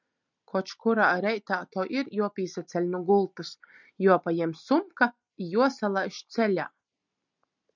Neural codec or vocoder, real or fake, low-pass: none; real; 7.2 kHz